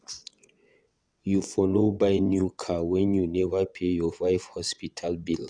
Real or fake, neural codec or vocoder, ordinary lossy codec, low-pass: fake; vocoder, 22.05 kHz, 80 mel bands, WaveNeXt; none; 9.9 kHz